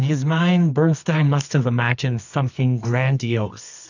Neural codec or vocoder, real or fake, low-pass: codec, 24 kHz, 0.9 kbps, WavTokenizer, medium music audio release; fake; 7.2 kHz